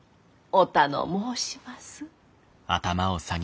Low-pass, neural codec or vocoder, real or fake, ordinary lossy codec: none; none; real; none